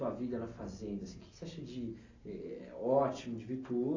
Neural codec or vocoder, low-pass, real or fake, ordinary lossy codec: none; 7.2 kHz; real; AAC, 32 kbps